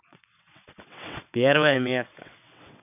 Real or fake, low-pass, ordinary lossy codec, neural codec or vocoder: fake; 3.6 kHz; none; vocoder, 22.05 kHz, 80 mel bands, WaveNeXt